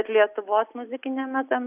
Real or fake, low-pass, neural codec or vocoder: real; 3.6 kHz; none